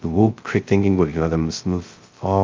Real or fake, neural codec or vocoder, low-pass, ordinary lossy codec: fake; codec, 16 kHz, 0.2 kbps, FocalCodec; 7.2 kHz; Opus, 24 kbps